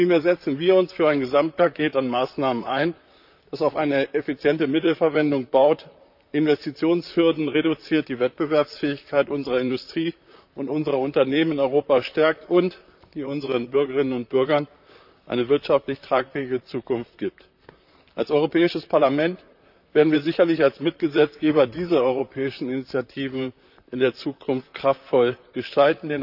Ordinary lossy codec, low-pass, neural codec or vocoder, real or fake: none; 5.4 kHz; vocoder, 44.1 kHz, 128 mel bands, Pupu-Vocoder; fake